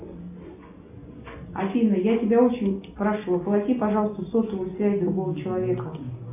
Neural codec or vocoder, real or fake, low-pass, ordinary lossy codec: none; real; 3.6 kHz; AAC, 32 kbps